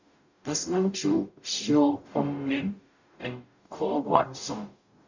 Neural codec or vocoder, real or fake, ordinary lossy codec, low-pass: codec, 44.1 kHz, 0.9 kbps, DAC; fake; none; 7.2 kHz